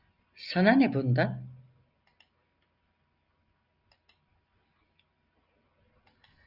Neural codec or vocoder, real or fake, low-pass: none; real; 5.4 kHz